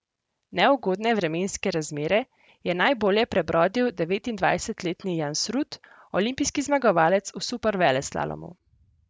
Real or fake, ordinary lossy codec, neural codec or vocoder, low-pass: real; none; none; none